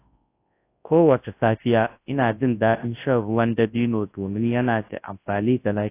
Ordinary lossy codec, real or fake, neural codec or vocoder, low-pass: AAC, 24 kbps; fake; codec, 24 kHz, 0.9 kbps, WavTokenizer, large speech release; 3.6 kHz